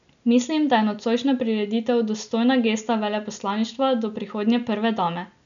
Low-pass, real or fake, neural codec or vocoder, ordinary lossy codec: 7.2 kHz; real; none; none